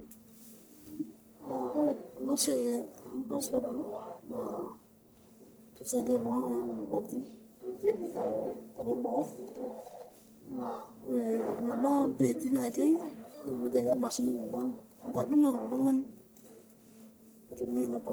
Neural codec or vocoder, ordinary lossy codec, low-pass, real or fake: codec, 44.1 kHz, 1.7 kbps, Pupu-Codec; none; none; fake